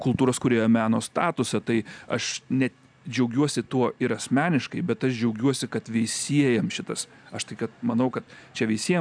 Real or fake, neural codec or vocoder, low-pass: real; none; 9.9 kHz